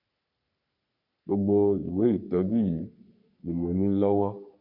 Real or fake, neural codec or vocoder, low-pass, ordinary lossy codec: fake; codec, 44.1 kHz, 3.4 kbps, Pupu-Codec; 5.4 kHz; none